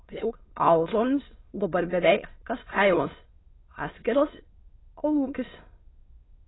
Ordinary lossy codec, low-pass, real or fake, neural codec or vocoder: AAC, 16 kbps; 7.2 kHz; fake; autoencoder, 22.05 kHz, a latent of 192 numbers a frame, VITS, trained on many speakers